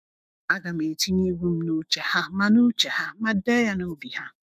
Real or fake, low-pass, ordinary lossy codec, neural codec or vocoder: fake; 14.4 kHz; none; autoencoder, 48 kHz, 128 numbers a frame, DAC-VAE, trained on Japanese speech